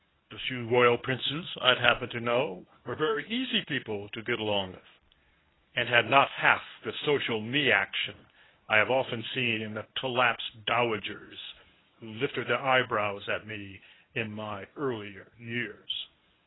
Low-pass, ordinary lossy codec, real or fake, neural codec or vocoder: 7.2 kHz; AAC, 16 kbps; fake; codec, 24 kHz, 0.9 kbps, WavTokenizer, medium speech release version 1